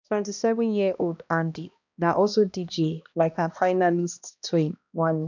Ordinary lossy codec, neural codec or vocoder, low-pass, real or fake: none; codec, 16 kHz, 1 kbps, X-Codec, HuBERT features, trained on balanced general audio; 7.2 kHz; fake